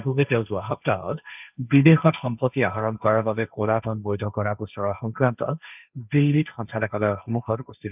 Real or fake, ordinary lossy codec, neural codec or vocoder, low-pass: fake; none; codec, 16 kHz, 1.1 kbps, Voila-Tokenizer; 3.6 kHz